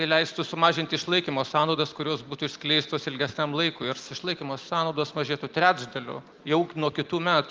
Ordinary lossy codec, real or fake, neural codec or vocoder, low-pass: Opus, 32 kbps; real; none; 7.2 kHz